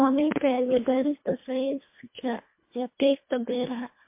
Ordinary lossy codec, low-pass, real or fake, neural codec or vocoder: MP3, 24 kbps; 3.6 kHz; fake; codec, 24 kHz, 1.5 kbps, HILCodec